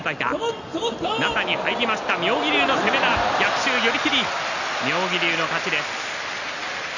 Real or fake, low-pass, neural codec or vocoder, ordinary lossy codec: real; 7.2 kHz; none; none